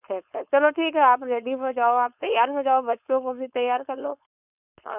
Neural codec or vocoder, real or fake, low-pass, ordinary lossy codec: codec, 16 kHz, 4.8 kbps, FACodec; fake; 3.6 kHz; none